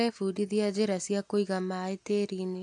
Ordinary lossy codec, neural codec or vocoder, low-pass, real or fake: AAC, 64 kbps; none; 10.8 kHz; real